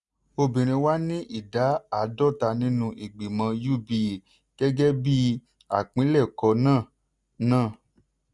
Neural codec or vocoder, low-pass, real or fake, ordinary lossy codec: none; 10.8 kHz; real; none